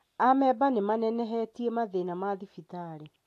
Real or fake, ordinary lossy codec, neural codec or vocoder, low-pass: real; AAC, 64 kbps; none; 14.4 kHz